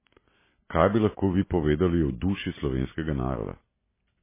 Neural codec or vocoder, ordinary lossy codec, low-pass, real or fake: none; MP3, 16 kbps; 3.6 kHz; real